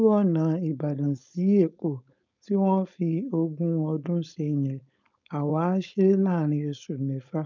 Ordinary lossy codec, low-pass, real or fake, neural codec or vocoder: none; 7.2 kHz; fake; codec, 16 kHz, 4.8 kbps, FACodec